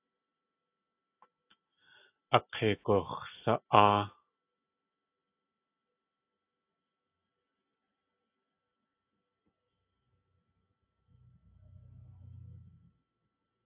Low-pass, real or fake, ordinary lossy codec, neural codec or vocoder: 3.6 kHz; real; AAC, 24 kbps; none